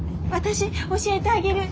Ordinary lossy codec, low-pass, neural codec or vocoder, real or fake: none; none; none; real